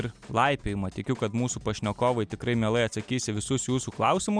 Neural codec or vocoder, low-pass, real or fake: none; 9.9 kHz; real